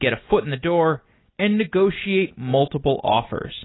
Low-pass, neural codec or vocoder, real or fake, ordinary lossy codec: 7.2 kHz; none; real; AAC, 16 kbps